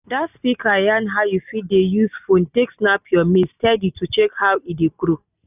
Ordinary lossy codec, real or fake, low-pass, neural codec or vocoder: none; real; 3.6 kHz; none